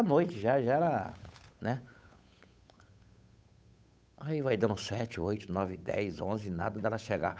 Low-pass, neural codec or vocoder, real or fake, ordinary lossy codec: none; codec, 16 kHz, 8 kbps, FunCodec, trained on Chinese and English, 25 frames a second; fake; none